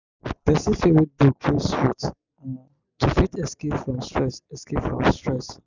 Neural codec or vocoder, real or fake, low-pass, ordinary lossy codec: none; real; 7.2 kHz; none